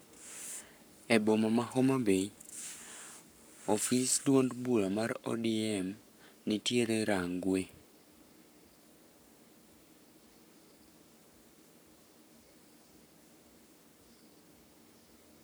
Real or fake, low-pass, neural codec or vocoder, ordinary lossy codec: fake; none; codec, 44.1 kHz, 7.8 kbps, Pupu-Codec; none